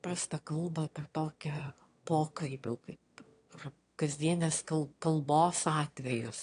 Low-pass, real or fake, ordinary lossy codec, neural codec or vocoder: 9.9 kHz; fake; AAC, 48 kbps; autoencoder, 22.05 kHz, a latent of 192 numbers a frame, VITS, trained on one speaker